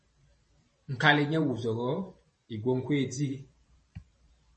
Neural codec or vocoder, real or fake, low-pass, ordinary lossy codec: vocoder, 44.1 kHz, 128 mel bands every 512 samples, BigVGAN v2; fake; 10.8 kHz; MP3, 32 kbps